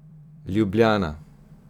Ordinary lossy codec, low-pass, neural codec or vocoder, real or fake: none; 19.8 kHz; vocoder, 44.1 kHz, 128 mel bands every 256 samples, BigVGAN v2; fake